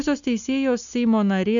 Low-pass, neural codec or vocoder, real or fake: 7.2 kHz; none; real